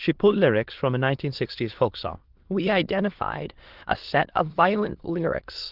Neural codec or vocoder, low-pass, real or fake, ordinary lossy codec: autoencoder, 22.05 kHz, a latent of 192 numbers a frame, VITS, trained on many speakers; 5.4 kHz; fake; Opus, 16 kbps